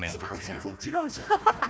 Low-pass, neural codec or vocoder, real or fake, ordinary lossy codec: none; codec, 16 kHz, 1 kbps, FunCodec, trained on Chinese and English, 50 frames a second; fake; none